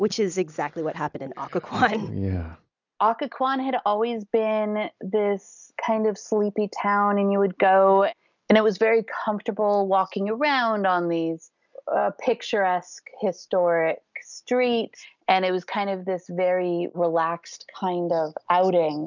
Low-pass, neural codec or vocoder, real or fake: 7.2 kHz; none; real